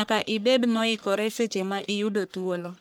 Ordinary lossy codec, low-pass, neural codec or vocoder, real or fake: none; none; codec, 44.1 kHz, 1.7 kbps, Pupu-Codec; fake